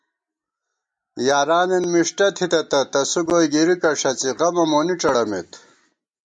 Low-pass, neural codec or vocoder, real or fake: 9.9 kHz; none; real